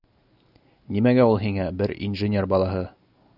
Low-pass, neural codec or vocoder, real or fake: 5.4 kHz; none; real